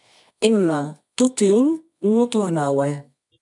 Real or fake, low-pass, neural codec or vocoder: fake; 10.8 kHz; codec, 24 kHz, 0.9 kbps, WavTokenizer, medium music audio release